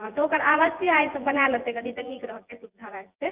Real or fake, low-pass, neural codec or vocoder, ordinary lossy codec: fake; 3.6 kHz; vocoder, 24 kHz, 100 mel bands, Vocos; Opus, 24 kbps